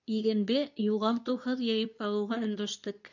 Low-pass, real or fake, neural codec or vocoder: 7.2 kHz; fake; codec, 24 kHz, 0.9 kbps, WavTokenizer, medium speech release version 2